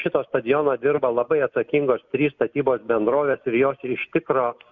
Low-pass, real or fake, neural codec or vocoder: 7.2 kHz; real; none